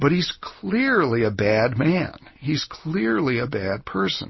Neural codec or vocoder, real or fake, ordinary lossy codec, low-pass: none; real; MP3, 24 kbps; 7.2 kHz